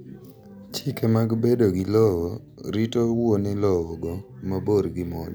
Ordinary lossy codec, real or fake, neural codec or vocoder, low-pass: none; real; none; none